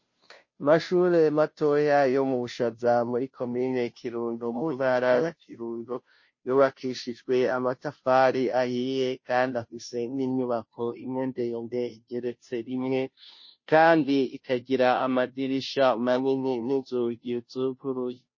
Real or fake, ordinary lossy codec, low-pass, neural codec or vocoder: fake; MP3, 32 kbps; 7.2 kHz; codec, 16 kHz, 0.5 kbps, FunCodec, trained on Chinese and English, 25 frames a second